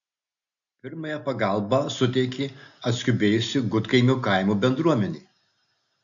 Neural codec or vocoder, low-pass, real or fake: none; 7.2 kHz; real